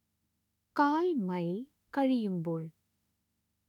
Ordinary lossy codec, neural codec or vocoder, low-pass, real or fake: none; autoencoder, 48 kHz, 32 numbers a frame, DAC-VAE, trained on Japanese speech; 19.8 kHz; fake